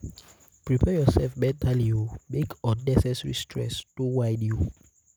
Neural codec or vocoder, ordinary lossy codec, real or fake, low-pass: none; none; real; none